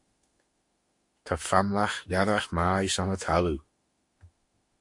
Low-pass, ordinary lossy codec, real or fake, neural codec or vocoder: 10.8 kHz; MP3, 48 kbps; fake; autoencoder, 48 kHz, 32 numbers a frame, DAC-VAE, trained on Japanese speech